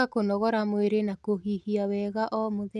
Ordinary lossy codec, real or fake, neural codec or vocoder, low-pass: none; real; none; none